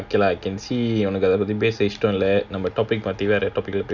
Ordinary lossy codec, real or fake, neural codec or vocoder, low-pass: none; real; none; 7.2 kHz